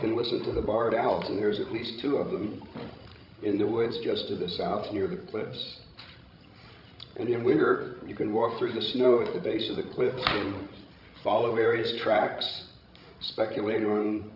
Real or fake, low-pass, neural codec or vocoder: fake; 5.4 kHz; codec, 16 kHz, 16 kbps, FreqCodec, larger model